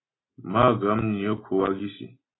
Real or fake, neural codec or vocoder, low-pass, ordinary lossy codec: real; none; 7.2 kHz; AAC, 16 kbps